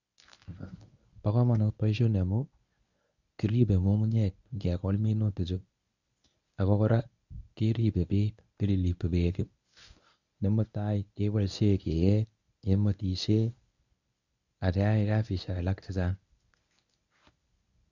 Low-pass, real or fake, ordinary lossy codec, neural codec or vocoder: 7.2 kHz; fake; none; codec, 24 kHz, 0.9 kbps, WavTokenizer, medium speech release version 1